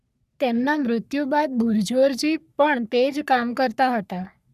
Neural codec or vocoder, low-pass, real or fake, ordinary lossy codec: codec, 44.1 kHz, 3.4 kbps, Pupu-Codec; 14.4 kHz; fake; none